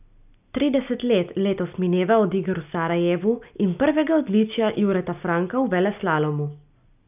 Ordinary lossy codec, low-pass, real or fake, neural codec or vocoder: none; 3.6 kHz; real; none